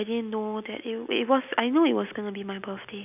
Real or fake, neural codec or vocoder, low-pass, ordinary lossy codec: real; none; 3.6 kHz; none